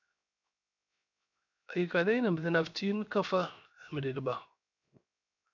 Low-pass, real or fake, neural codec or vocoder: 7.2 kHz; fake; codec, 16 kHz, 0.7 kbps, FocalCodec